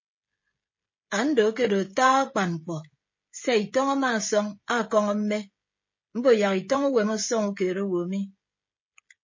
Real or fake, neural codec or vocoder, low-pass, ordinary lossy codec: fake; codec, 16 kHz, 16 kbps, FreqCodec, smaller model; 7.2 kHz; MP3, 32 kbps